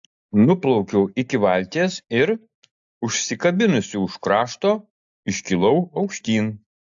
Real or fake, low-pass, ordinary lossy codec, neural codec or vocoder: real; 7.2 kHz; AAC, 64 kbps; none